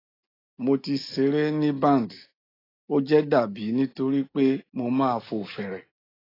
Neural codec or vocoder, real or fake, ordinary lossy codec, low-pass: none; real; AAC, 24 kbps; 5.4 kHz